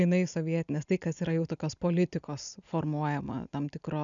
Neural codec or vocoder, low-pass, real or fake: none; 7.2 kHz; real